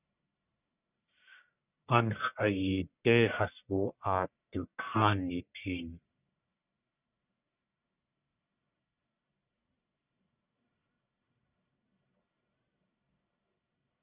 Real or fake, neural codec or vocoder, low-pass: fake; codec, 44.1 kHz, 1.7 kbps, Pupu-Codec; 3.6 kHz